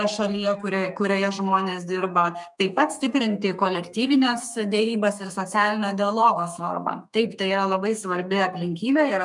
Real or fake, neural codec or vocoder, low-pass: fake; codec, 32 kHz, 1.9 kbps, SNAC; 10.8 kHz